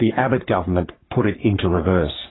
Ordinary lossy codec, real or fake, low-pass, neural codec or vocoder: AAC, 16 kbps; fake; 7.2 kHz; codec, 44.1 kHz, 3.4 kbps, Pupu-Codec